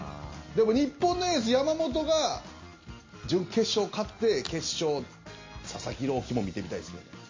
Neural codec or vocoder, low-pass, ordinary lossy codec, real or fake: none; 7.2 kHz; MP3, 32 kbps; real